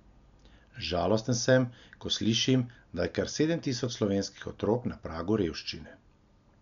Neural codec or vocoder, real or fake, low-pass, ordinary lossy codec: none; real; 7.2 kHz; none